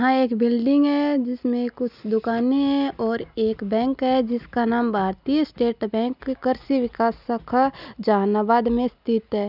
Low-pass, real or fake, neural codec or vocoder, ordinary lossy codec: 5.4 kHz; real; none; none